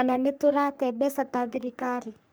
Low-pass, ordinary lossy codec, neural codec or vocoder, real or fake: none; none; codec, 44.1 kHz, 3.4 kbps, Pupu-Codec; fake